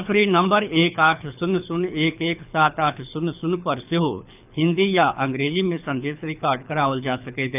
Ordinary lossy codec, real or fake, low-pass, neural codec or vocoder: none; fake; 3.6 kHz; codec, 24 kHz, 6 kbps, HILCodec